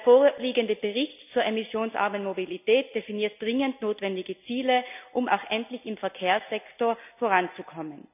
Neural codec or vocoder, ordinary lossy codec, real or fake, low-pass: none; none; real; 3.6 kHz